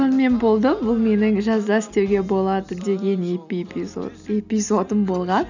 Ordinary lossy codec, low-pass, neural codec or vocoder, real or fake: none; 7.2 kHz; none; real